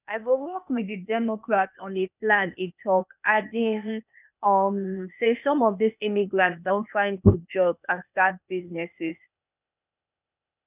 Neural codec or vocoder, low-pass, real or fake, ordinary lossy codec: codec, 16 kHz, 0.8 kbps, ZipCodec; 3.6 kHz; fake; none